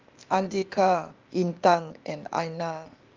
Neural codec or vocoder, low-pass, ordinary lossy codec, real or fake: codec, 16 kHz, 0.8 kbps, ZipCodec; 7.2 kHz; Opus, 32 kbps; fake